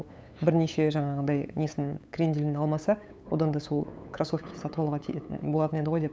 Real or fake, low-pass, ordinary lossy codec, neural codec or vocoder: fake; none; none; codec, 16 kHz, 8 kbps, FunCodec, trained on LibriTTS, 25 frames a second